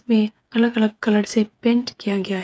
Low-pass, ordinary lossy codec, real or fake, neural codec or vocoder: none; none; fake; codec, 16 kHz, 8 kbps, FreqCodec, smaller model